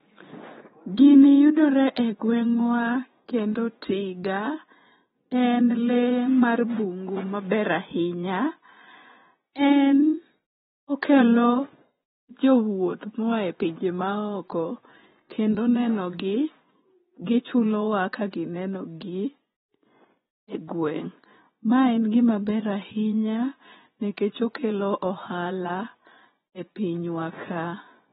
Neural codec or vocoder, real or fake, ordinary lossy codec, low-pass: vocoder, 24 kHz, 100 mel bands, Vocos; fake; AAC, 16 kbps; 10.8 kHz